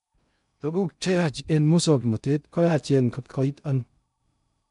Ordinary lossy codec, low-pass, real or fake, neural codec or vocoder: none; 10.8 kHz; fake; codec, 16 kHz in and 24 kHz out, 0.6 kbps, FocalCodec, streaming, 2048 codes